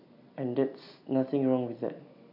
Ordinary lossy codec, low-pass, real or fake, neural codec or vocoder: none; 5.4 kHz; real; none